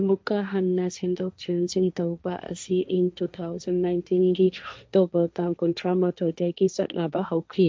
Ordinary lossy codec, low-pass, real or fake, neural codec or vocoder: none; none; fake; codec, 16 kHz, 1.1 kbps, Voila-Tokenizer